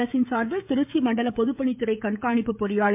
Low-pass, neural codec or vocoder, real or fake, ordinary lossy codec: 3.6 kHz; codec, 16 kHz, 16 kbps, FreqCodec, smaller model; fake; MP3, 32 kbps